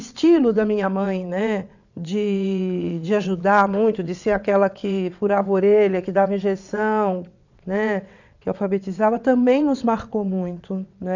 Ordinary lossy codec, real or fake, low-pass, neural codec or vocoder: none; fake; 7.2 kHz; vocoder, 22.05 kHz, 80 mel bands, WaveNeXt